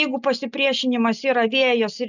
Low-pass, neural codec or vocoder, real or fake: 7.2 kHz; none; real